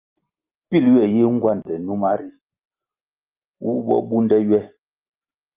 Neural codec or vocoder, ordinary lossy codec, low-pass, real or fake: none; Opus, 24 kbps; 3.6 kHz; real